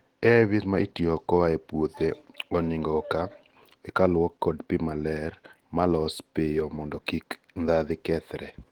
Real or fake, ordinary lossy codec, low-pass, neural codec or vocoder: fake; Opus, 16 kbps; 19.8 kHz; autoencoder, 48 kHz, 128 numbers a frame, DAC-VAE, trained on Japanese speech